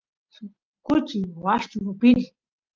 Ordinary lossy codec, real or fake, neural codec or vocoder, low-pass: Opus, 24 kbps; real; none; 7.2 kHz